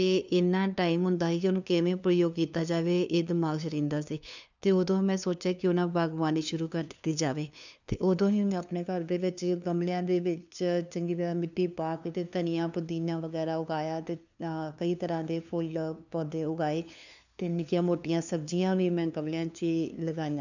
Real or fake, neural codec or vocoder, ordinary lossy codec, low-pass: fake; codec, 16 kHz, 2 kbps, FunCodec, trained on LibriTTS, 25 frames a second; none; 7.2 kHz